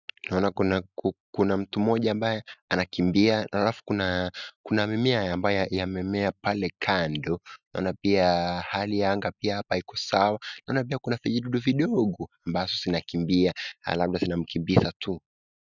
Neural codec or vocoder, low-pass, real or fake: none; 7.2 kHz; real